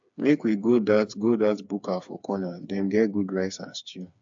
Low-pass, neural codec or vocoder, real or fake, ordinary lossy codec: 7.2 kHz; codec, 16 kHz, 4 kbps, FreqCodec, smaller model; fake; AAC, 64 kbps